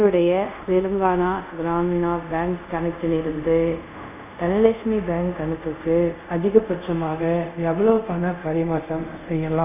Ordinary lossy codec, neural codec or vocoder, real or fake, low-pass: none; codec, 24 kHz, 0.5 kbps, DualCodec; fake; 3.6 kHz